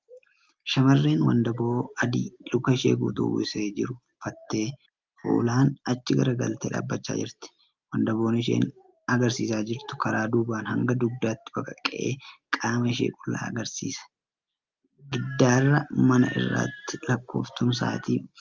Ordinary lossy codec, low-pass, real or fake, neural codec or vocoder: Opus, 32 kbps; 7.2 kHz; real; none